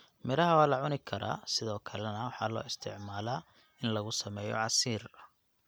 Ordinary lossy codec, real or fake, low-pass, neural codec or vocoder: none; real; none; none